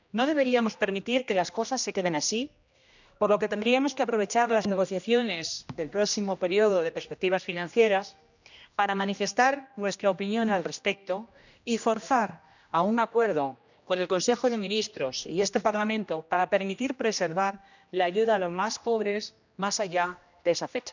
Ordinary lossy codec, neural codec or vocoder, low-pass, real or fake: none; codec, 16 kHz, 1 kbps, X-Codec, HuBERT features, trained on general audio; 7.2 kHz; fake